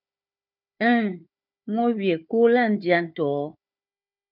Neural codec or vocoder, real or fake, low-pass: codec, 16 kHz, 4 kbps, FunCodec, trained on Chinese and English, 50 frames a second; fake; 5.4 kHz